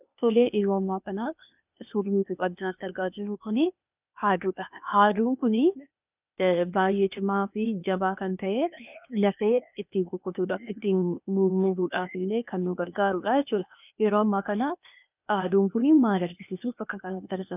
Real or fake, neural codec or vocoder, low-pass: fake; codec, 16 kHz, 0.8 kbps, ZipCodec; 3.6 kHz